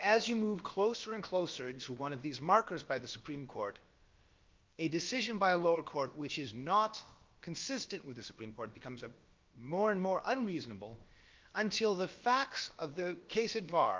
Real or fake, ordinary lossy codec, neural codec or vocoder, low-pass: fake; Opus, 32 kbps; codec, 16 kHz, about 1 kbps, DyCAST, with the encoder's durations; 7.2 kHz